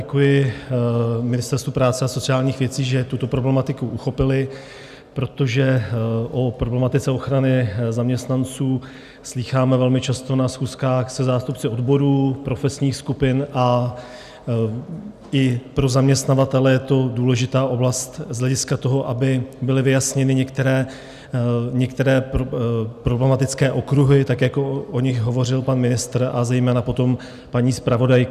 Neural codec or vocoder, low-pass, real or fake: none; 14.4 kHz; real